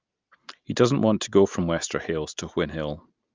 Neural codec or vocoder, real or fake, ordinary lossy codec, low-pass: none; real; Opus, 24 kbps; 7.2 kHz